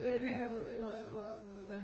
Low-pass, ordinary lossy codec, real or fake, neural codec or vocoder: 7.2 kHz; Opus, 24 kbps; fake; codec, 16 kHz, 1 kbps, FreqCodec, larger model